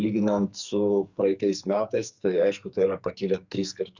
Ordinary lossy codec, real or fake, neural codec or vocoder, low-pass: Opus, 64 kbps; fake; codec, 44.1 kHz, 2.6 kbps, SNAC; 7.2 kHz